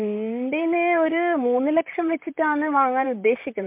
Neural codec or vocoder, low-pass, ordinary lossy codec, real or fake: vocoder, 44.1 kHz, 128 mel bands, Pupu-Vocoder; 3.6 kHz; MP3, 32 kbps; fake